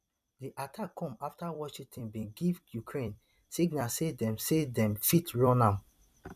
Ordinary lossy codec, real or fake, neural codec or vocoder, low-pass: none; fake; vocoder, 44.1 kHz, 128 mel bands every 256 samples, BigVGAN v2; 14.4 kHz